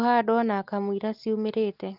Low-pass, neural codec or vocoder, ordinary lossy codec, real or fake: 5.4 kHz; none; Opus, 32 kbps; real